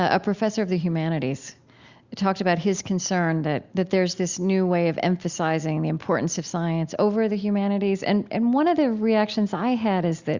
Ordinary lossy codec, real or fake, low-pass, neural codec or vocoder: Opus, 64 kbps; real; 7.2 kHz; none